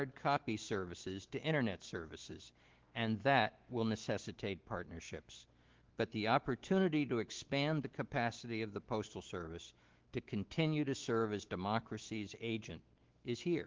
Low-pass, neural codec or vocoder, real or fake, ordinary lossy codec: 7.2 kHz; autoencoder, 48 kHz, 128 numbers a frame, DAC-VAE, trained on Japanese speech; fake; Opus, 16 kbps